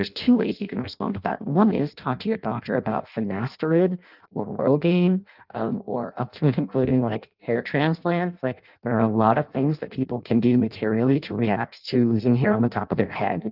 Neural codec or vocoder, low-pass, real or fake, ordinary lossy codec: codec, 16 kHz in and 24 kHz out, 0.6 kbps, FireRedTTS-2 codec; 5.4 kHz; fake; Opus, 32 kbps